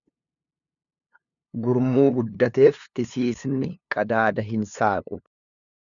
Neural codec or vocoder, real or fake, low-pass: codec, 16 kHz, 2 kbps, FunCodec, trained on LibriTTS, 25 frames a second; fake; 7.2 kHz